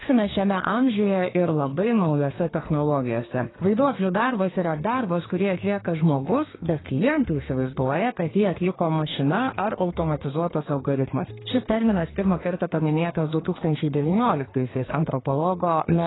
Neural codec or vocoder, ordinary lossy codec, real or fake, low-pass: codec, 32 kHz, 1.9 kbps, SNAC; AAC, 16 kbps; fake; 7.2 kHz